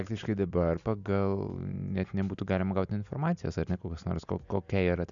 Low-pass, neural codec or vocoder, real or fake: 7.2 kHz; none; real